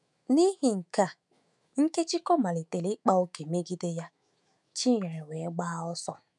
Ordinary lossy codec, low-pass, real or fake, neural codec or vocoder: none; 10.8 kHz; fake; autoencoder, 48 kHz, 128 numbers a frame, DAC-VAE, trained on Japanese speech